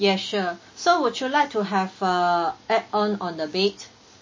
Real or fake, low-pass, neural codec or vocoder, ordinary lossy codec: real; 7.2 kHz; none; MP3, 32 kbps